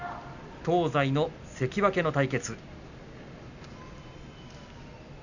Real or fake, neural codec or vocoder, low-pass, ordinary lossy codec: real; none; 7.2 kHz; none